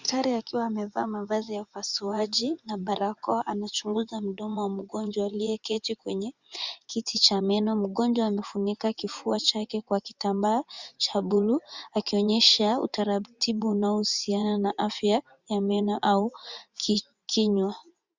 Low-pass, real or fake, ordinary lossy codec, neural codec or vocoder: 7.2 kHz; fake; Opus, 64 kbps; vocoder, 24 kHz, 100 mel bands, Vocos